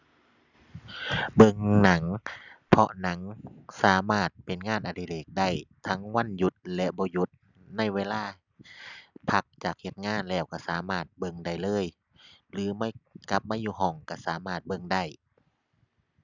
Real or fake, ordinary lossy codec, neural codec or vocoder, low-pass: real; none; none; 7.2 kHz